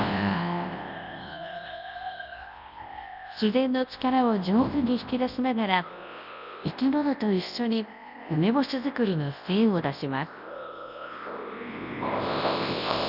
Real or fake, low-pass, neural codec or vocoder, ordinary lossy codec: fake; 5.4 kHz; codec, 24 kHz, 0.9 kbps, WavTokenizer, large speech release; none